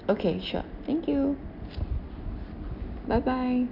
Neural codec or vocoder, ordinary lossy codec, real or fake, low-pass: none; none; real; 5.4 kHz